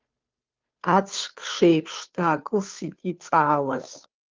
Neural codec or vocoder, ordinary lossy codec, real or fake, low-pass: codec, 16 kHz, 2 kbps, FunCodec, trained on Chinese and English, 25 frames a second; Opus, 32 kbps; fake; 7.2 kHz